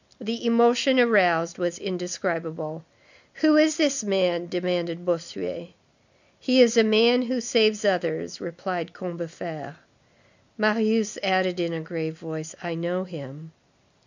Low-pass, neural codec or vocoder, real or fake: 7.2 kHz; none; real